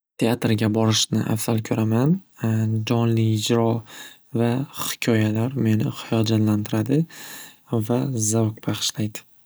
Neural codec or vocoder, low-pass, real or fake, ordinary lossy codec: none; none; real; none